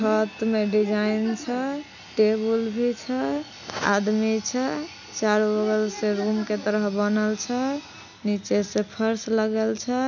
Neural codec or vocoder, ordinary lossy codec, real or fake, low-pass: none; none; real; 7.2 kHz